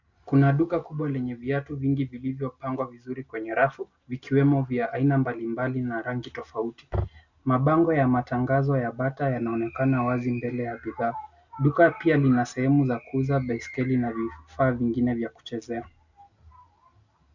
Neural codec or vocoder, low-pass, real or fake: none; 7.2 kHz; real